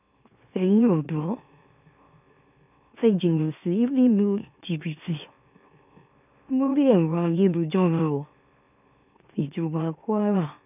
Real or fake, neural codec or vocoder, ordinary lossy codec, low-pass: fake; autoencoder, 44.1 kHz, a latent of 192 numbers a frame, MeloTTS; none; 3.6 kHz